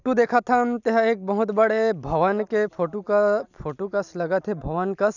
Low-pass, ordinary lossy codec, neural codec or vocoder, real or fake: 7.2 kHz; none; none; real